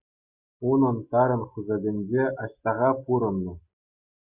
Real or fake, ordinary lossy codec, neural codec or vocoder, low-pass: real; Opus, 64 kbps; none; 3.6 kHz